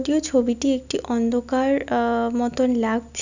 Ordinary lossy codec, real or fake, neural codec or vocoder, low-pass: none; real; none; 7.2 kHz